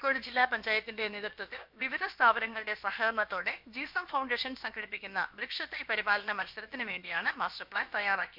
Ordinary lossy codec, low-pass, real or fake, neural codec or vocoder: MP3, 48 kbps; 5.4 kHz; fake; codec, 16 kHz, about 1 kbps, DyCAST, with the encoder's durations